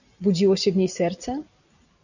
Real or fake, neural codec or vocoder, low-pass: real; none; 7.2 kHz